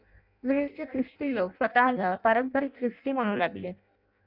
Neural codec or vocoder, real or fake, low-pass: codec, 16 kHz in and 24 kHz out, 0.6 kbps, FireRedTTS-2 codec; fake; 5.4 kHz